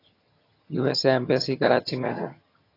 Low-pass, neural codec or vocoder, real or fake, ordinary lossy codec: 5.4 kHz; vocoder, 22.05 kHz, 80 mel bands, HiFi-GAN; fake; AAC, 32 kbps